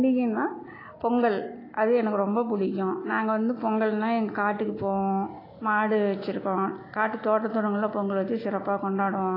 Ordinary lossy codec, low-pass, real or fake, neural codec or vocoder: AAC, 24 kbps; 5.4 kHz; real; none